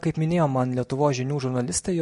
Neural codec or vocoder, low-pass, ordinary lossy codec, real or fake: none; 14.4 kHz; MP3, 48 kbps; real